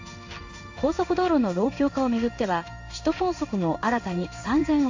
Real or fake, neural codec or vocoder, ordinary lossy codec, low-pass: fake; codec, 16 kHz in and 24 kHz out, 1 kbps, XY-Tokenizer; AAC, 48 kbps; 7.2 kHz